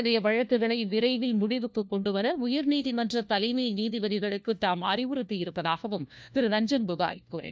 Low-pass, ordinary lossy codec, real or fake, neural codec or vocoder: none; none; fake; codec, 16 kHz, 1 kbps, FunCodec, trained on LibriTTS, 50 frames a second